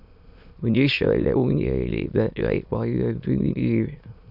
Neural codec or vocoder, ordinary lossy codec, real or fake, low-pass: autoencoder, 22.05 kHz, a latent of 192 numbers a frame, VITS, trained on many speakers; AAC, 48 kbps; fake; 5.4 kHz